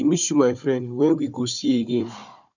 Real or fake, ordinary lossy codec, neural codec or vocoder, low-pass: fake; none; codec, 16 kHz, 4 kbps, FunCodec, trained on Chinese and English, 50 frames a second; 7.2 kHz